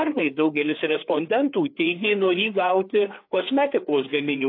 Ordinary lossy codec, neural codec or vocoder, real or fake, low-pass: AAC, 32 kbps; codec, 16 kHz, 4 kbps, FreqCodec, larger model; fake; 5.4 kHz